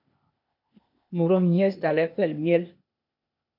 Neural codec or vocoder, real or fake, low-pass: codec, 16 kHz, 0.8 kbps, ZipCodec; fake; 5.4 kHz